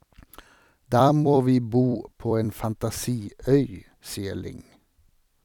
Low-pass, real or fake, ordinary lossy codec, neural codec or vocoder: 19.8 kHz; fake; none; vocoder, 44.1 kHz, 128 mel bands every 256 samples, BigVGAN v2